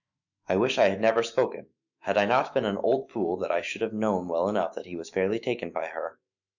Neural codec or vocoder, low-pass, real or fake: autoencoder, 48 kHz, 128 numbers a frame, DAC-VAE, trained on Japanese speech; 7.2 kHz; fake